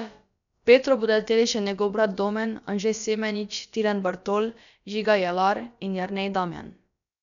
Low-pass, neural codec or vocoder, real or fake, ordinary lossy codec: 7.2 kHz; codec, 16 kHz, about 1 kbps, DyCAST, with the encoder's durations; fake; none